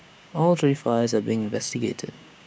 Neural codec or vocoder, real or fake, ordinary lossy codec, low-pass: codec, 16 kHz, 6 kbps, DAC; fake; none; none